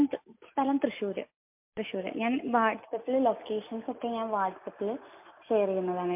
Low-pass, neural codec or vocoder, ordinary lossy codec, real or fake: 3.6 kHz; none; MP3, 32 kbps; real